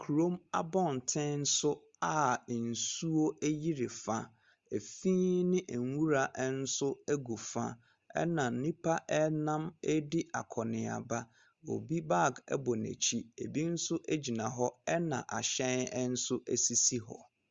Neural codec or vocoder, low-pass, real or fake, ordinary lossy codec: none; 7.2 kHz; real; Opus, 32 kbps